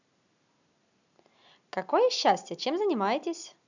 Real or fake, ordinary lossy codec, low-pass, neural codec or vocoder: real; none; 7.2 kHz; none